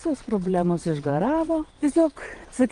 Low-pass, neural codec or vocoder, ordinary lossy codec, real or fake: 9.9 kHz; vocoder, 22.05 kHz, 80 mel bands, Vocos; Opus, 24 kbps; fake